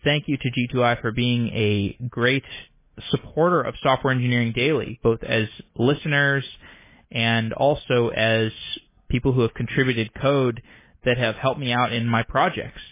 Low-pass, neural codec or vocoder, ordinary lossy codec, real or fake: 3.6 kHz; none; MP3, 16 kbps; real